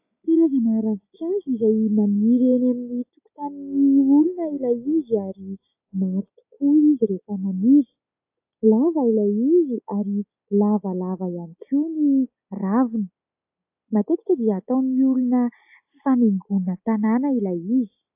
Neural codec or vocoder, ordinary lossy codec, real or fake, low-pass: none; AAC, 32 kbps; real; 3.6 kHz